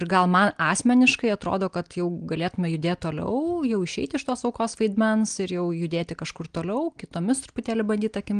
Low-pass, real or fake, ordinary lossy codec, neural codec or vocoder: 9.9 kHz; real; Opus, 24 kbps; none